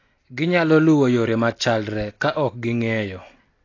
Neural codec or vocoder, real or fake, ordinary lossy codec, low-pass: none; real; AAC, 32 kbps; 7.2 kHz